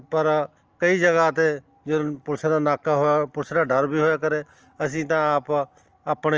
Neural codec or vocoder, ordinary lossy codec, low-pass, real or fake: none; Opus, 24 kbps; 7.2 kHz; real